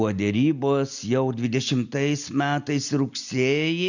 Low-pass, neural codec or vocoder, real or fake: 7.2 kHz; none; real